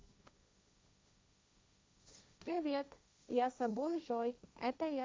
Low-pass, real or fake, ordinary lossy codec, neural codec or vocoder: none; fake; none; codec, 16 kHz, 1.1 kbps, Voila-Tokenizer